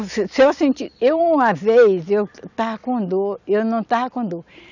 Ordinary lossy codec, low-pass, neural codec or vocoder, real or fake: none; 7.2 kHz; none; real